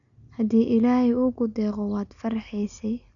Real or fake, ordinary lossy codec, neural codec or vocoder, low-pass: real; none; none; 7.2 kHz